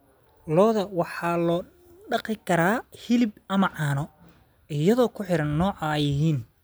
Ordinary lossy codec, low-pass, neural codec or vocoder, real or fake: none; none; none; real